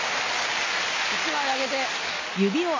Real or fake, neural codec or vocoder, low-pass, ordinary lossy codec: real; none; 7.2 kHz; MP3, 32 kbps